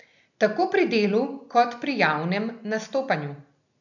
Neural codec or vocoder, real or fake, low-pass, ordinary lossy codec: none; real; 7.2 kHz; none